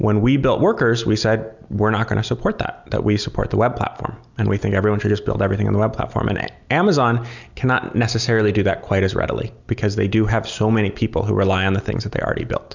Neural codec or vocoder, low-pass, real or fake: none; 7.2 kHz; real